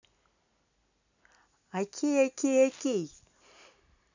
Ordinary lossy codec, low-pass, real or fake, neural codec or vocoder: none; 7.2 kHz; real; none